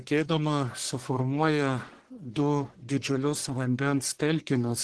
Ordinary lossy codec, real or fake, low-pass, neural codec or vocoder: Opus, 16 kbps; fake; 10.8 kHz; codec, 44.1 kHz, 1.7 kbps, Pupu-Codec